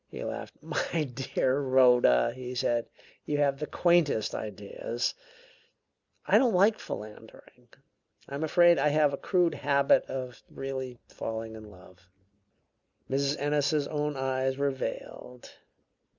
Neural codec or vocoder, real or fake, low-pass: none; real; 7.2 kHz